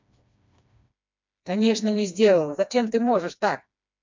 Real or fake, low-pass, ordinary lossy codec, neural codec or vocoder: fake; 7.2 kHz; MP3, 64 kbps; codec, 16 kHz, 2 kbps, FreqCodec, smaller model